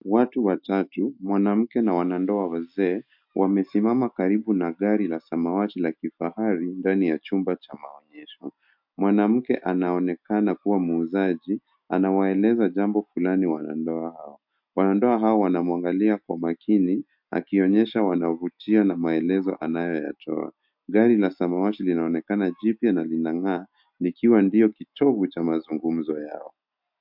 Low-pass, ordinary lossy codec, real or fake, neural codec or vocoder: 5.4 kHz; MP3, 48 kbps; real; none